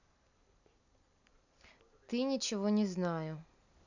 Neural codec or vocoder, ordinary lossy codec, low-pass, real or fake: none; none; 7.2 kHz; real